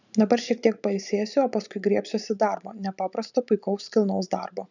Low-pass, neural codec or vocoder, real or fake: 7.2 kHz; vocoder, 44.1 kHz, 128 mel bands every 512 samples, BigVGAN v2; fake